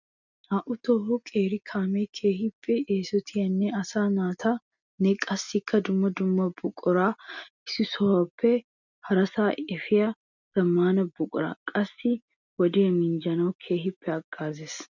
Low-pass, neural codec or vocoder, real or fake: 7.2 kHz; none; real